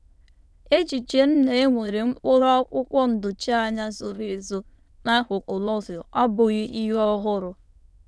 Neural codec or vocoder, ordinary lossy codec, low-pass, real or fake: autoencoder, 22.05 kHz, a latent of 192 numbers a frame, VITS, trained on many speakers; none; none; fake